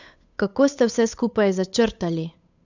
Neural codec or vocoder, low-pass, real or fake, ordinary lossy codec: codec, 16 kHz, 8 kbps, FunCodec, trained on Chinese and English, 25 frames a second; 7.2 kHz; fake; none